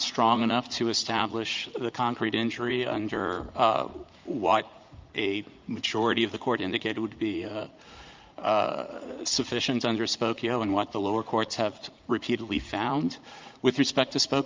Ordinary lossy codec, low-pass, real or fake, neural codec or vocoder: Opus, 24 kbps; 7.2 kHz; fake; vocoder, 44.1 kHz, 80 mel bands, Vocos